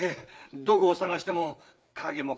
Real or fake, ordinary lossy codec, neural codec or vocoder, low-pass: fake; none; codec, 16 kHz, 4 kbps, FreqCodec, larger model; none